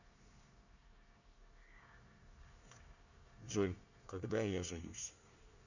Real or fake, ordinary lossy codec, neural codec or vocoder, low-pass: fake; none; codec, 24 kHz, 1 kbps, SNAC; 7.2 kHz